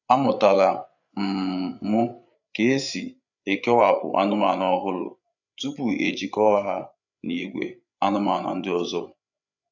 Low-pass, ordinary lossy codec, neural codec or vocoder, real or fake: 7.2 kHz; none; codec, 16 kHz, 8 kbps, FreqCodec, larger model; fake